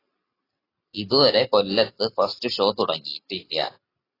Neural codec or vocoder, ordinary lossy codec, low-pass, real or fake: none; AAC, 24 kbps; 5.4 kHz; real